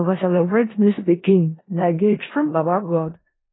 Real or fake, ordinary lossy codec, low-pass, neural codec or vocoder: fake; AAC, 16 kbps; 7.2 kHz; codec, 16 kHz in and 24 kHz out, 0.4 kbps, LongCat-Audio-Codec, four codebook decoder